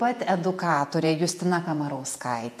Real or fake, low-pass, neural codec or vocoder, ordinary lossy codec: fake; 14.4 kHz; vocoder, 48 kHz, 128 mel bands, Vocos; MP3, 64 kbps